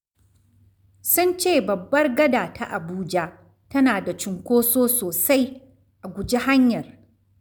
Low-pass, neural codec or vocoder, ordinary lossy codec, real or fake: none; none; none; real